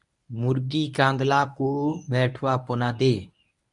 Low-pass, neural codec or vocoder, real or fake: 10.8 kHz; codec, 24 kHz, 0.9 kbps, WavTokenizer, medium speech release version 1; fake